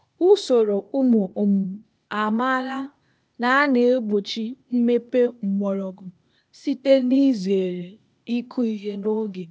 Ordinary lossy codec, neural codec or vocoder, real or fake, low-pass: none; codec, 16 kHz, 0.8 kbps, ZipCodec; fake; none